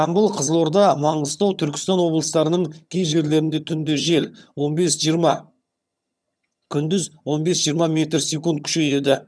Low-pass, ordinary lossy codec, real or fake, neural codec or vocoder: none; none; fake; vocoder, 22.05 kHz, 80 mel bands, HiFi-GAN